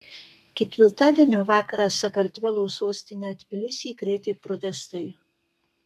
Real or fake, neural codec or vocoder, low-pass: fake; codec, 32 kHz, 1.9 kbps, SNAC; 14.4 kHz